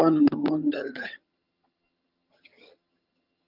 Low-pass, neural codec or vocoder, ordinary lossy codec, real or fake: 5.4 kHz; vocoder, 22.05 kHz, 80 mel bands, HiFi-GAN; Opus, 24 kbps; fake